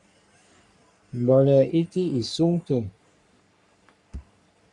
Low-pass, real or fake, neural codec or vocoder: 10.8 kHz; fake; codec, 44.1 kHz, 3.4 kbps, Pupu-Codec